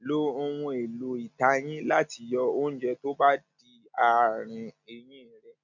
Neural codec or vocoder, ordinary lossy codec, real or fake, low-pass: none; none; real; 7.2 kHz